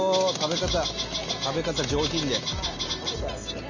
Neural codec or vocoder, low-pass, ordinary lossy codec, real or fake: none; 7.2 kHz; none; real